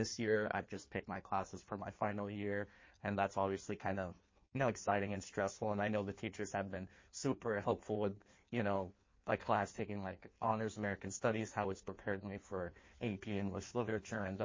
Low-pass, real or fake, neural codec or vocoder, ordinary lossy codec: 7.2 kHz; fake; codec, 16 kHz in and 24 kHz out, 1.1 kbps, FireRedTTS-2 codec; MP3, 32 kbps